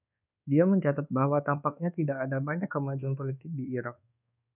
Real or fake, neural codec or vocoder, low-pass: fake; codec, 24 kHz, 1.2 kbps, DualCodec; 3.6 kHz